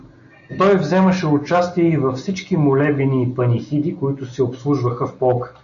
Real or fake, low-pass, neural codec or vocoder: real; 7.2 kHz; none